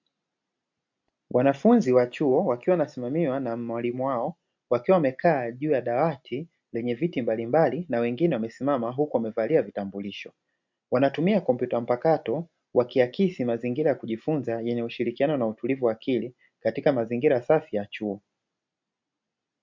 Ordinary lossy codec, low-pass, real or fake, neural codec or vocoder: MP3, 64 kbps; 7.2 kHz; real; none